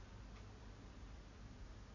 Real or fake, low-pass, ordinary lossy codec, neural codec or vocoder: real; 7.2 kHz; none; none